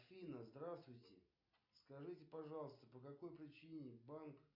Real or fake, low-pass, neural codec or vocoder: real; 5.4 kHz; none